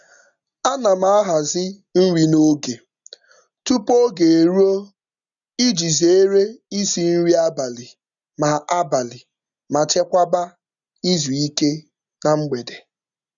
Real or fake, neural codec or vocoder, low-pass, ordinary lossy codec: real; none; 7.2 kHz; none